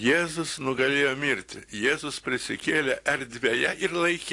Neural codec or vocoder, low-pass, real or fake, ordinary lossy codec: none; 10.8 kHz; real; AAC, 48 kbps